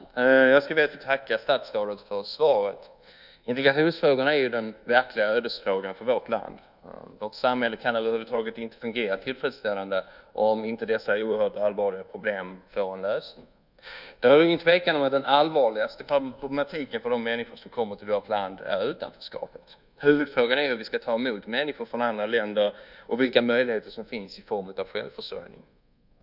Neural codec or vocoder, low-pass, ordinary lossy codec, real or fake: codec, 24 kHz, 1.2 kbps, DualCodec; 5.4 kHz; none; fake